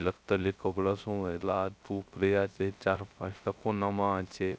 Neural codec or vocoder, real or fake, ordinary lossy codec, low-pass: codec, 16 kHz, 0.3 kbps, FocalCodec; fake; none; none